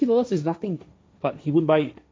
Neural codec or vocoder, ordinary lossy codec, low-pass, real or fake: codec, 16 kHz, 1.1 kbps, Voila-Tokenizer; none; none; fake